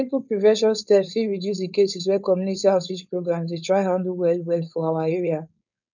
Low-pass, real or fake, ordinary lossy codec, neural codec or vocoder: 7.2 kHz; fake; none; codec, 16 kHz, 4.8 kbps, FACodec